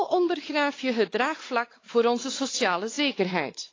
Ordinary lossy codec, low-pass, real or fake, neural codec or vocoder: AAC, 32 kbps; 7.2 kHz; fake; codec, 16 kHz, 4 kbps, X-Codec, WavLM features, trained on Multilingual LibriSpeech